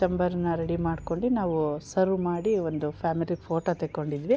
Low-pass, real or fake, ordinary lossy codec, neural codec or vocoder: none; real; none; none